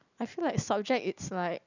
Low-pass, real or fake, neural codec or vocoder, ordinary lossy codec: 7.2 kHz; real; none; none